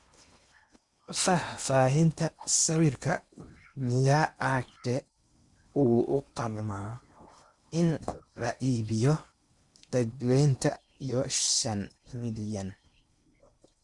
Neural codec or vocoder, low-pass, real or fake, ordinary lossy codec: codec, 16 kHz in and 24 kHz out, 0.8 kbps, FocalCodec, streaming, 65536 codes; 10.8 kHz; fake; Opus, 64 kbps